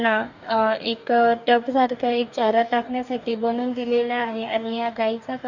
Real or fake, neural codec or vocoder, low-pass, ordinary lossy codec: fake; codec, 44.1 kHz, 2.6 kbps, DAC; 7.2 kHz; none